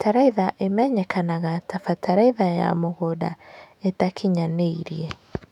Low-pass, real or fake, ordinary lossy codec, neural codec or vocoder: 19.8 kHz; fake; none; autoencoder, 48 kHz, 128 numbers a frame, DAC-VAE, trained on Japanese speech